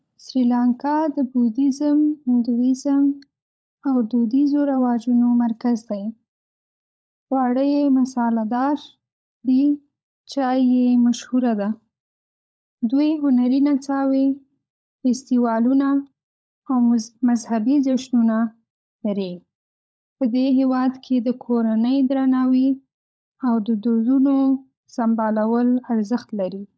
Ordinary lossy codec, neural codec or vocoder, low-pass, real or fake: none; codec, 16 kHz, 16 kbps, FunCodec, trained on LibriTTS, 50 frames a second; none; fake